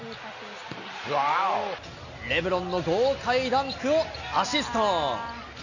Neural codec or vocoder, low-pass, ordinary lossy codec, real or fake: none; 7.2 kHz; none; real